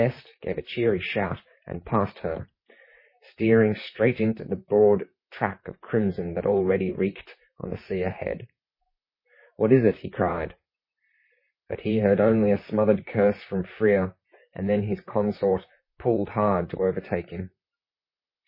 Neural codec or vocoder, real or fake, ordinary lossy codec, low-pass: vocoder, 44.1 kHz, 128 mel bands, Pupu-Vocoder; fake; MP3, 24 kbps; 5.4 kHz